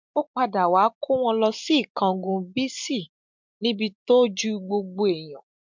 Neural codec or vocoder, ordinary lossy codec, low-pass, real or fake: none; MP3, 64 kbps; 7.2 kHz; real